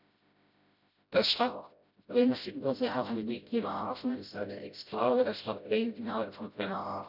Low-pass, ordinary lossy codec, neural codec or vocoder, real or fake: 5.4 kHz; none; codec, 16 kHz, 0.5 kbps, FreqCodec, smaller model; fake